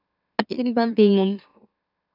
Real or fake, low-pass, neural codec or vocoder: fake; 5.4 kHz; autoencoder, 44.1 kHz, a latent of 192 numbers a frame, MeloTTS